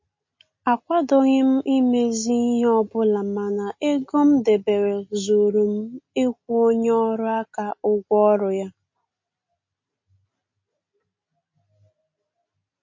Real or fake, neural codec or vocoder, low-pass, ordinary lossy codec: real; none; 7.2 kHz; MP3, 32 kbps